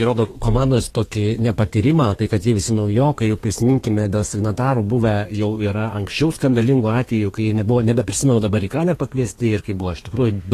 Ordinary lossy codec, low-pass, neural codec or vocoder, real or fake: AAC, 48 kbps; 14.4 kHz; codec, 32 kHz, 1.9 kbps, SNAC; fake